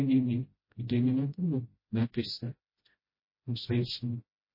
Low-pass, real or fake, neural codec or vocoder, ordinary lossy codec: 5.4 kHz; fake; codec, 16 kHz, 0.5 kbps, FreqCodec, smaller model; MP3, 24 kbps